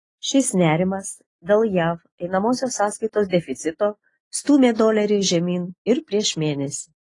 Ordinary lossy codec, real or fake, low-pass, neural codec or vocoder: AAC, 32 kbps; real; 10.8 kHz; none